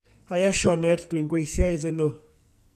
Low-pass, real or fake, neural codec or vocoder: 14.4 kHz; fake; codec, 44.1 kHz, 2.6 kbps, SNAC